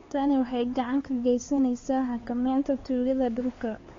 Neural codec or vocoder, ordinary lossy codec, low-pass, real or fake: codec, 16 kHz, 4 kbps, X-Codec, HuBERT features, trained on LibriSpeech; AAC, 32 kbps; 7.2 kHz; fake